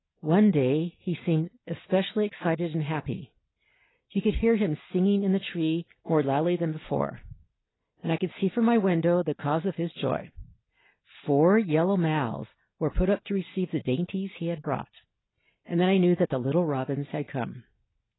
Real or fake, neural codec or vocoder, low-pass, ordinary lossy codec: real; none; 7.2 kHz; AAC, 16 kbps